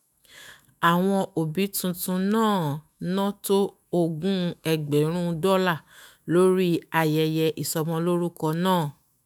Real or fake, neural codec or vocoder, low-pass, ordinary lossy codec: fake; autoencoder, 48 kHz, 128 numbers a frame, DAC-VAE, trained on Japanese speech; none; none